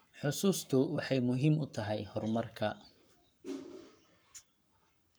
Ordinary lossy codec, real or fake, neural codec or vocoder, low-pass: none; fake; codec, 44.1 kHz, 7.8 kbps, Pupu-Codec; none